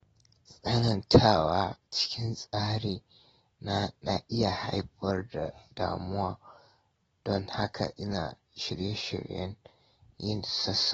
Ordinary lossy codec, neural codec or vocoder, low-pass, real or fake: AAC, 24 kbps; none; 19.8 kHz; real